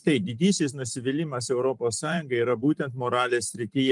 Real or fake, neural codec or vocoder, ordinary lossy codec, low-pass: fake; vocoder, 44.1 kHz, 128 mel bands every 512 samples, BigVGAN v2; Opus, 32 kbps; 10.8 kHz